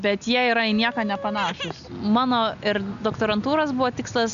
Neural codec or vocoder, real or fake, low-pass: none; real; 7.2 kHz